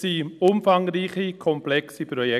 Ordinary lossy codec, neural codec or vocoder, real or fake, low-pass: none; none; real; 14.4 kHz